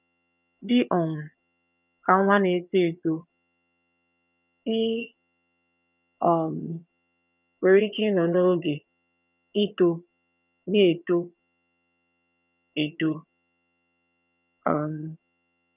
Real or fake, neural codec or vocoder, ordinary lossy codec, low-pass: fake; vocoder, 22.05 kHz, 80 mel bands, HiFi-GAN; none; 3.6 kHz